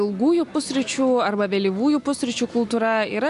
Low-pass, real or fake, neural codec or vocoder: 10.8 kHz; real; none